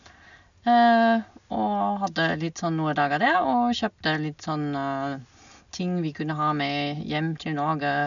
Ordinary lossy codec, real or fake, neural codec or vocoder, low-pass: none; real; none; 7.2 kHz